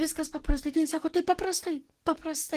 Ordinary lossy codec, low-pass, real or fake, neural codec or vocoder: Opus, 16 kbps; 14.4 kHz; fake; codec, 44.1 kHz, 7.8 kbps, DAC